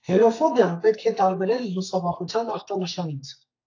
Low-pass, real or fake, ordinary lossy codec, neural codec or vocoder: 7.2 kHz; fake; AAC, 48 kbps; codec, 32 kHz, 1.9 kbps, SNAC